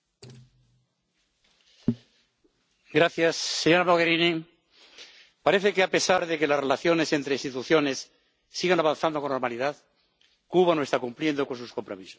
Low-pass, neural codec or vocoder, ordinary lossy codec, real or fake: none; none; none; real